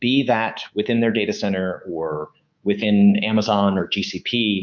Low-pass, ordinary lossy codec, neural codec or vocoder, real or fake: 7.2 kHz; Opus, 64 kbps; codec, 24 kHz, 3.1 kbps, DualCodec; fake